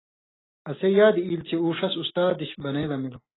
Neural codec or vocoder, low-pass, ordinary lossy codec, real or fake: none; 7.2 kHz; AAC, 16 kbps; real